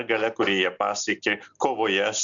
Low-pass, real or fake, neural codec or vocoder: 7.2 kHz; real; none